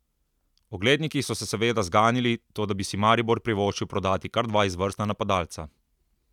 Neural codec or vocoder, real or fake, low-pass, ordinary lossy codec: none; real; 19.8 kHz; none